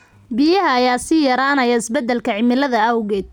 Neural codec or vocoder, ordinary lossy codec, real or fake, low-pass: none; none; real; 19.8 kHz